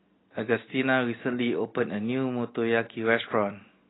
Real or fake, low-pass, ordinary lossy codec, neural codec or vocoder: real; 7.2 kHz; AAC, 16 kbps; none